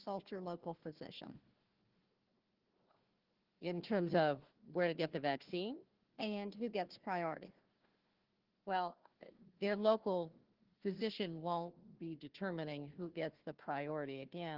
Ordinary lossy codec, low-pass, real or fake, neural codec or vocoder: Opus, 16 kbps; 5.4 kHz; fake; codec, 16 kHz, 1 kbps, FunCodec, trained on Chinese and English, 50 frames a second